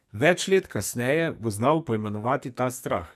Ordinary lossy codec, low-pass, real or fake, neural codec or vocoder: none; 14.4 kHz; fake; codec, 44.1 kHz, 2.6 kbps, SNAC